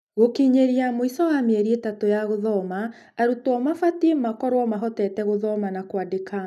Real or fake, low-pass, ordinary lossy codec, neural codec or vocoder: real; 14.4 kHz; none; none